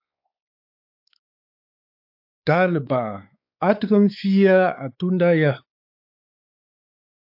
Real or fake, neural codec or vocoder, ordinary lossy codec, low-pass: fake; codec, 16 kHz, 4 kbps, X-Codec, WavLM features, trained on Multilingual LibriSpeech; AAC, 48 kbps; 5.4 kHz